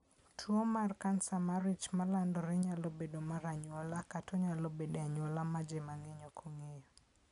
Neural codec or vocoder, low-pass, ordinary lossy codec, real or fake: none; 10.8 kHz; none; real